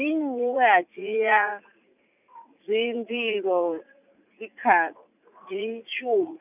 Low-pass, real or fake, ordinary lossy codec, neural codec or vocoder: 3.6 kHz; fake; none; vocoder, 44.1 kHz, 80 mel bands, Vocos